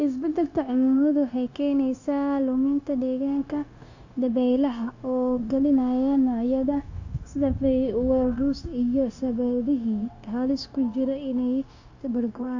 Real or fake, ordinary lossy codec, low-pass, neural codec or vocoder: fake; AAC, 48 kbps; 7.2 kHz; codec, 16 kHz, 0.9 kbps, LongCat-Audio-Codec